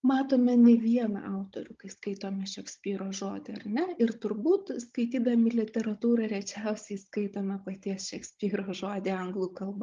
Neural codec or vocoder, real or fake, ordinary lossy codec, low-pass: codec, 16 kHz, 16 kbps, FunCodec, trained on Chinese and English, 50 frames a second; fake; Opus, 32 kbps; 7.2 kHz